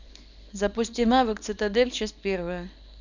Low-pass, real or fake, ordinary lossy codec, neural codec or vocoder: 7.2 kHz; fake; none; codec, 24 kHz, 0.9 kbps, WavTokenizer, small release